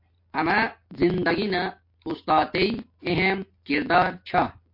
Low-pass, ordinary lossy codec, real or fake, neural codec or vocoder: 5.4 kHz; MP3, 32 kbps; real; none